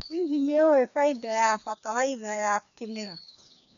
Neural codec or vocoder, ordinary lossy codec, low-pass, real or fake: codec, 16 kHz, 2 kbps, FreqCodec, larger model; none; 7.2 kHz; fake